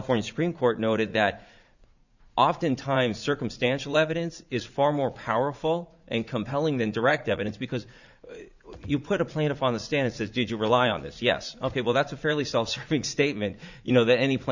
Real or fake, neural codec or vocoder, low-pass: fake; vocoder, 44.1 kHz, 80 mel bands, Vocos; 7.2 kHz